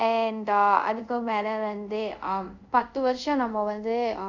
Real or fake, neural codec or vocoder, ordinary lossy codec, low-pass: fake; codec, 24 kHz, 0.5 kbps, DualCodec; none; 7.2 kHz